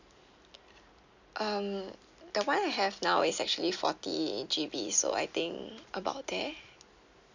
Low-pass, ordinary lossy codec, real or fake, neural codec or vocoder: 7.2 kHz; none; real; none